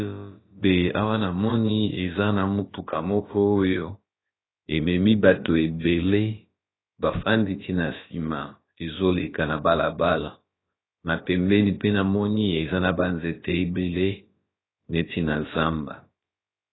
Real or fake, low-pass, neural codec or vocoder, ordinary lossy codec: fake; 7.2 kHz; codec, 16 kHz, about 1 kbps, DyCAST, with the encoder's durations; AAC, 16 kbps